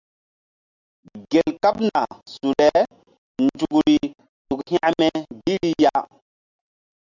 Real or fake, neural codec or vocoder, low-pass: real; none; 7.2 kHz